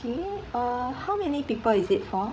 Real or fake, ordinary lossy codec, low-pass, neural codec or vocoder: fake; none; none; codec, 16 kHz, 16 kbps, FreqCodec, larger model